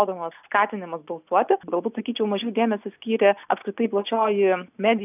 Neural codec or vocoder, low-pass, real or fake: none; 3.6 kHz; real